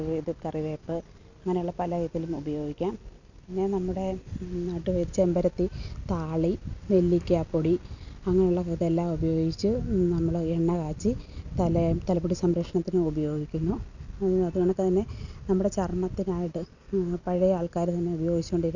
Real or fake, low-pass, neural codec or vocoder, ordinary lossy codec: real; 7.2 kHz; none; none